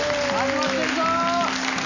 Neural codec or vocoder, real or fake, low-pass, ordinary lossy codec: none; real; 7.2 kHz; none